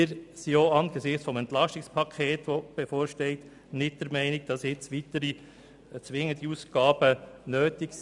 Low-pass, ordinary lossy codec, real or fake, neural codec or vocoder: 10.8 kHz; none; real; none